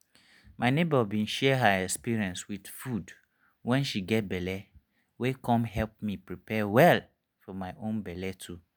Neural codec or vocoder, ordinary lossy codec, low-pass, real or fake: none; none; none; real